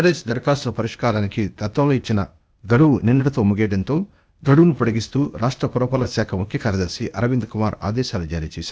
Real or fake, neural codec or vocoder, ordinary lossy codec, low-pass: fake; codec, 16 kHz, 0.8 kbps, ZipCodec; none; none